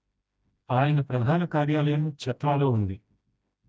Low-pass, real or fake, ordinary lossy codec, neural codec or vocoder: none; fake; none; codec, 16 kHz, 1 kbps, FreqCodec, smaller model